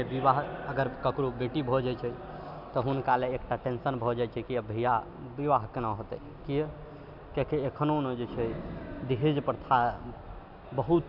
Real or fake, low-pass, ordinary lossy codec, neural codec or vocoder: real; 5.4 kHz; none; none